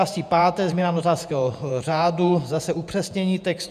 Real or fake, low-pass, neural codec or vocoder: fake; 14.4 kHz; vocoder, 48 kHz, 128 mel bands, Vocos